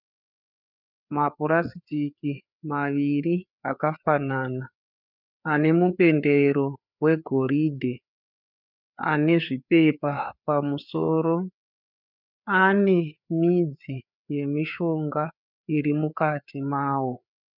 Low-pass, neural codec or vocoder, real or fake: 5.4 kHz; codec, 16 kHz, 4 kbps, FreqCodec, larger model; fake